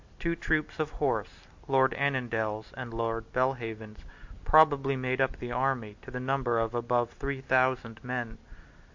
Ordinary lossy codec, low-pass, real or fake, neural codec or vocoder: MP3, 48 kbps; 7.2 kHz; real; none